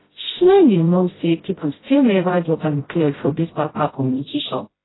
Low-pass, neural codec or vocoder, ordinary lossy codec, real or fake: 7.2 kHz; codec, 16 kHz, 0.5 kbps, FreqCodec, smaller model; AAC, 16 kbps; fake